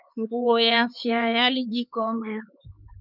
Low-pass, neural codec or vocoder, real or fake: 5.4 kHz; codec, 16 kHz, 4 kbps, X-Codec, HuBERT features, trained on LibriSpeech; fake